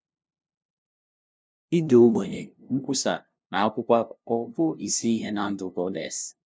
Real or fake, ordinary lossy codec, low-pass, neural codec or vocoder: fake; none; none; codec, 16 kHz, 0.5 kbps, FunCodec, trained on LibriTTS, 25 frames a second